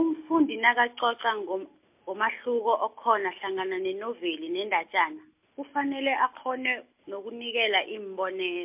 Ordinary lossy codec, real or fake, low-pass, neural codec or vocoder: MP3, 32 kbps; real; 3.6 kHz; none